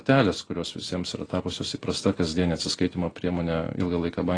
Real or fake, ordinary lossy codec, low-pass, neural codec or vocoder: real; AAC, 32 kbps; 9.9 kHz; none